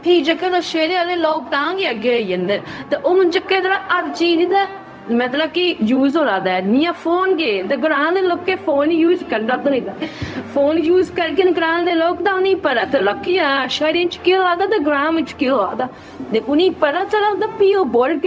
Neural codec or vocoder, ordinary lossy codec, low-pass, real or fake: codec, 16 kHz, 0.4 kbps, LongCat-Audio-Codec; none; none; fake